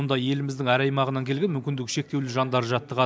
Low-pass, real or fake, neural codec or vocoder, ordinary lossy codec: none; real; none; none